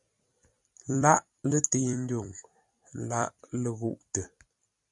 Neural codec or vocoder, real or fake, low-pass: vocoder, 44.1 kHz, 128 mel bands every 256 samples, BigVGAN v2; fake; 10.8 kHz